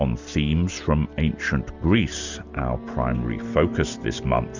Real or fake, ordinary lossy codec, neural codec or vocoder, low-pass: real; Opus, 64 kbps; none; 7.2 kHz